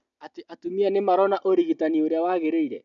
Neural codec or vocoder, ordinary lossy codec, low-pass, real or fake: none; none; 7.2 kHz; real